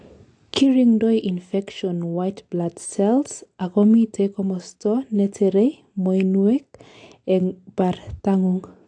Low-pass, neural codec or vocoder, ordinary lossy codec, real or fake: 9.9 kHz; none; AAC, 48 kbps; real